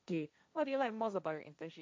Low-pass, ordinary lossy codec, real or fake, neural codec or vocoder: none; none; fake; codec, 16 kHz, 1.1 kbps, Voila-Tokenizer